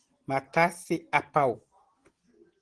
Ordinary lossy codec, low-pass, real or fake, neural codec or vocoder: Opus, 16 kbps; 9.9 kHz; real; none